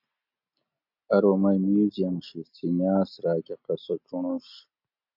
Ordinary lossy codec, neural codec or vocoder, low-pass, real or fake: MP3, 48 kbps; none; 5.4 kHz; real